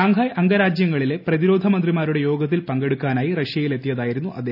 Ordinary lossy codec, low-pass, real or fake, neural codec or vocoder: none; 5.4 kHz; real; none